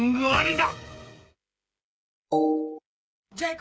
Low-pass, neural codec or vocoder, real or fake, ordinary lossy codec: none; codec, 16 kHz, 8 kbps, FreqCodec, smaller model; fake; none